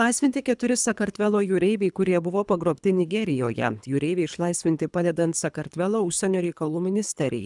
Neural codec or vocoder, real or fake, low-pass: codec, 24 kHz, 3 kbps, HILCodec; fake; 10.8 kHz